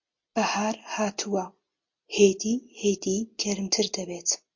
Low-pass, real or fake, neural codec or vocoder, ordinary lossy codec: 7.2 kHz; real; none; MP3, 48 kbps